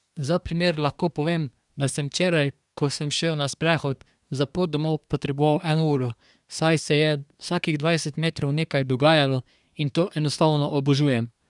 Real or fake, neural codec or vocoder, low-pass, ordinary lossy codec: fake; codec, 24 kHz, 1 kbps, SNAC; 10.8 kHz; none